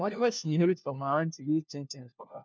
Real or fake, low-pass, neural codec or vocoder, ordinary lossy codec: fake; none; codec, 16 kHz, 1 kbps, FunCodec, trained on LibriTTS, 50 frames a second; none